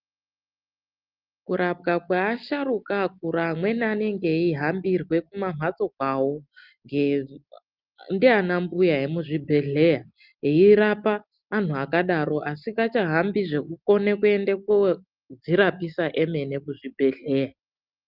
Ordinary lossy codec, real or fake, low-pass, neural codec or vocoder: Opus, 24 kbps; real; 5.4 kHz; none